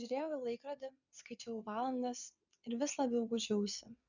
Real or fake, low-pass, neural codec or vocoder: fake; 7.2 kHz; vocoder, 22.05 kHz, 80 mel bands, WaveNeXt